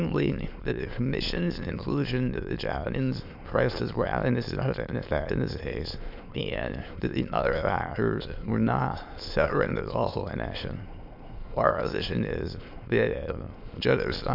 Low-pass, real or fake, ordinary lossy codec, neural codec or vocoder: 5.4 kHz; fake; MP3, 48 kbps; autoencoder, 22.05 kHz, a latent of 192 numbers a frame, VITS, trained on many speakers